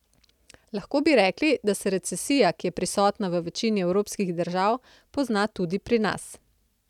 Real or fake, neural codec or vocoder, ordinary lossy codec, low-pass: real; none; none; 19.8 kHz